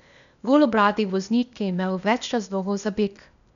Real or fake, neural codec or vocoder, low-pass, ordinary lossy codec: fake; codec, 16 kHz, 0.8 kbps, ZipCodec; 7.2 kHz; none